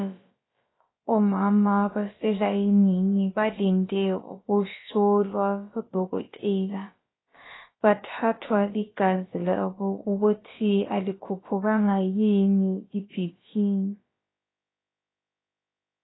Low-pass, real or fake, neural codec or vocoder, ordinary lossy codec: 7.2 kHz; fake; codec, 16 kHz, about 1 kbps, DyCAST, with the encoder's durations; AAC, 16 kbps